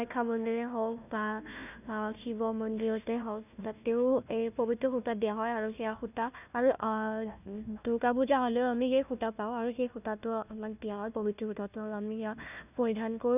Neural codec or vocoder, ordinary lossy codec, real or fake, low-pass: codec, 16 kHz, 1 kbps, FunCodec, trained on Chinese and English, 50 frames a second; none; fake; 3.6 kHz